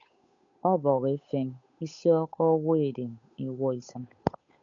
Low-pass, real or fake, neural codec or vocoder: 7.2 kHz; fake; codec, 16 kHz, 8 kbps, FunCodec, trained on Chinese and English, 25 frames a second